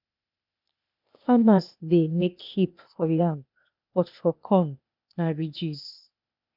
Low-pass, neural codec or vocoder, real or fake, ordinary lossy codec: 5.4 kHz; codec, 16 kHz, 0.8 kbps, ZipCodec; fake; none